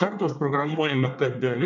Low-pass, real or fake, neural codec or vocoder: 7.2 kHz; fake; codec, 24 kHz, 1 kbps, SNAC